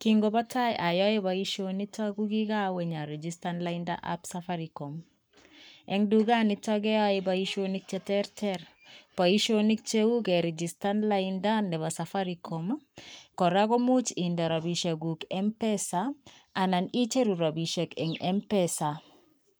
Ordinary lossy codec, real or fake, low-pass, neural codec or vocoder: none; fake; none; codec, 44.1 kHz, 7.8 kbps, Pupu-Codec